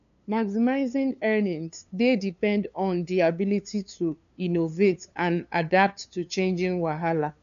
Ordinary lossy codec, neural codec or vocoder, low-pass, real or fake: none; codec, 16 kHz, 2 kbps, FunCodec, trained on LibriTTS, 25 frames a second; 7.2 kHz; fake